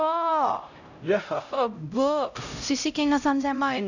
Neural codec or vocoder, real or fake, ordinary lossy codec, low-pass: codec, 16 kHz, 0.5 kbps, X-Codec, HuBERT features, trained on LibriSpeech; fake; none; 7.2 kHz